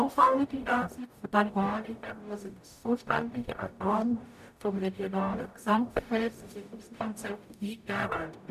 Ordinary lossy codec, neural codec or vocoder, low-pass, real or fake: none; codec, 44.1 kHz, 0.9 kbps, DAC; 14.4 kHz; fake